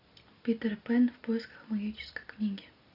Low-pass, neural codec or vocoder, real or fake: 5.4 kHz; none; real